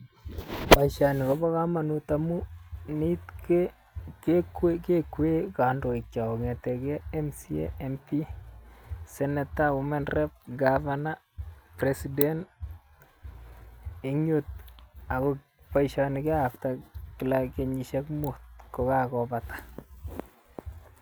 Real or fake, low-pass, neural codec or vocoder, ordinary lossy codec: real; none; none; none